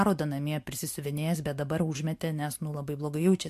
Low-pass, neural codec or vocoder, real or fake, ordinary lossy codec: 14.4 kHz; none; real; MP3, 64 kbps